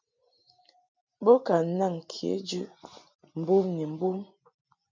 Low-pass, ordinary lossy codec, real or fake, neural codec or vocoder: 7.2 kHz; MP3, 64 kbps; real; none